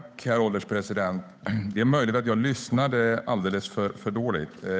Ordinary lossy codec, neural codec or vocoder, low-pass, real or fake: none; codec, 16 kHz, 8 kbps, FunCodec, trained on Chinese and English, 25 frames a second; none; fake